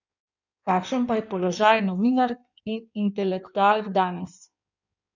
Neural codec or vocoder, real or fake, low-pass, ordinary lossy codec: codec, 16 kHz in and 24 kHz out, 1.1 kbps, FireRedTTS-2 codec; fake; 7.2 kHz; none